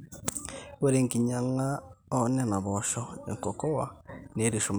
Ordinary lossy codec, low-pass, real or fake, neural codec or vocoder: none; none; real; none